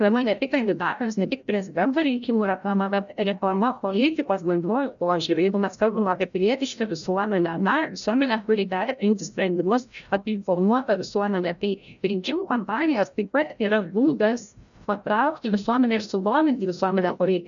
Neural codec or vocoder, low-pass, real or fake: codec, 16 kHz, 0.5 kbps, FreqCodec, larger model; 7.2 kHz; fake